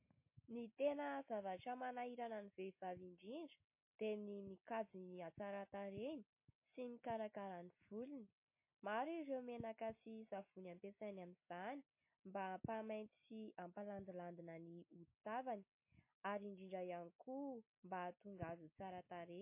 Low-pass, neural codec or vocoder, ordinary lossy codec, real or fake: 3.6 kHz; none; MP3, 24 kbps; real